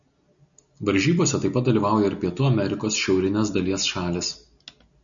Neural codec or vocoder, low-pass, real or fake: none; 7.2 kHz; real